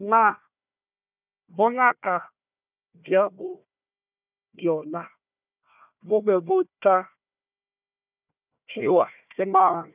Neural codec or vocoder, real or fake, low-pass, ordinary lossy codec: codec, 16 kHz, 1 kbps, FunCodec, trained on Chinese and English, 50 frames a second; fake; 3.6 kHz; none